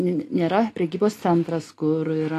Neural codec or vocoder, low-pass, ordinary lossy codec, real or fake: none; 14.4 kHz; AAC, 48 kbps; real